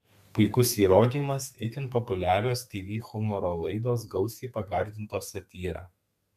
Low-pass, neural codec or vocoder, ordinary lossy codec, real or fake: 14.4 kHz; codec, 32 kHz, 1.9 kbps, SNAC; MP3, 96 kbps; fake